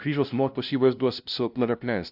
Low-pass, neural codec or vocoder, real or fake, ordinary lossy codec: 5.4 kHz; codec, 16 kHz, 0.5 kbps, FunCodec, trained on LibriTTS, 25 frames a second; fake; AAC, 48 kbps